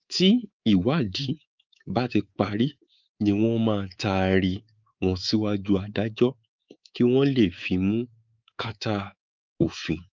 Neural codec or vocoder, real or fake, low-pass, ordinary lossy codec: codec, 24 kHz, 3.1 kbps, DualCodec; fake; 7.2 kHz; Opus, 24 kbps